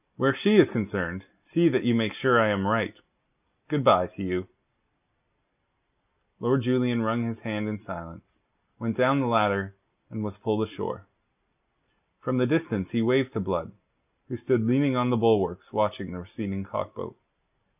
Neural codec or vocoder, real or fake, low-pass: none; real; 3.6 kHz